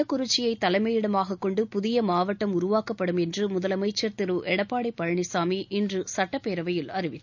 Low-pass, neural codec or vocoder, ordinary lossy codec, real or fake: 7.2 kHz; none; none; real